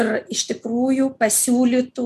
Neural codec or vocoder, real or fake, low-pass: none; real; 14.4 kHz